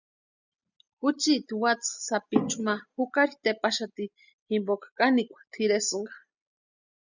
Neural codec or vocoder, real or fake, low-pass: none; real; 7.2 kHz